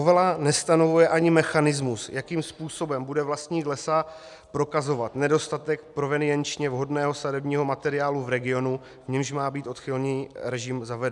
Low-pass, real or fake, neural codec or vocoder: 10.8 kHz; real; none